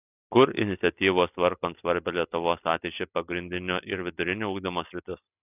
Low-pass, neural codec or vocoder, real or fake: 3.6 kHz; none; real